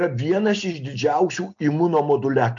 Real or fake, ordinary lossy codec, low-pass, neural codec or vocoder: real; MP3, 48 kbps; 7.2 kHz; none